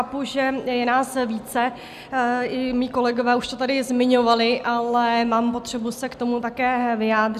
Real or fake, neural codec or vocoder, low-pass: real; none; 14.4 kHz